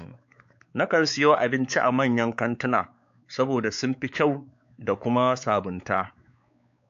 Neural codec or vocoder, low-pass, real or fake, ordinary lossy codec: codec, 16 kHz, 4 kbps, X-Codec, WavLM features, trained on Multilingual LibriSpeech; 7.2 kHz; fake; AAC, 64 kbps